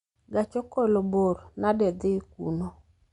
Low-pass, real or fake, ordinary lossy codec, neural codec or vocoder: 10.8 kHz; real; Opus, 64 kbps; none